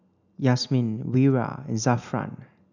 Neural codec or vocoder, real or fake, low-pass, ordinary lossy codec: none; real; 7.2 kHz; none